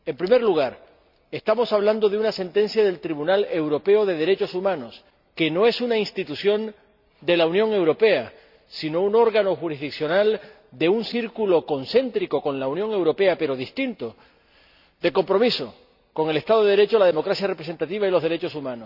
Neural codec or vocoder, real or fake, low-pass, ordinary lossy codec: none; real; 5.4 kHz; none